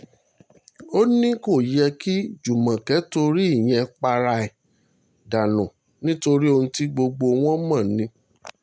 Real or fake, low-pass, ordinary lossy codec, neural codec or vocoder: real; none; none; none